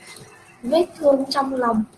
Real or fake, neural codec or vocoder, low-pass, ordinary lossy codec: real; none; 10.8 kHz; Opus, 16 kbps